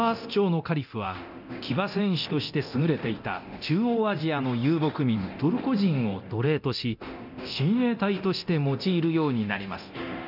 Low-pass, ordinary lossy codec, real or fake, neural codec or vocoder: 5.4 kHz; none; fake; codec, 24 kHz, 0.9 kbps, DualCodec